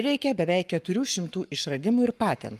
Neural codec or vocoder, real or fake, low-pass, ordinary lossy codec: codec, 44.1 kHz, 7.8 kbps, Pupu-Codec; fake; 14.4 kHz; Opus, 32 kbps